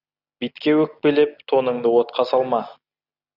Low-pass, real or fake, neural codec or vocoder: 5.4 kHz; real; none